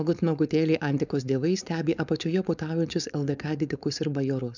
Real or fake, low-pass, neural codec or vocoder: fake; 7.2 kHz; codec, 16 kHz, 4.8 kbps, FACodec